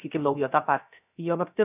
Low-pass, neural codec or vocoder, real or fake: 3.6 kHz; codec, 16 kHz, 0.3 kbps, FocalCodec; fake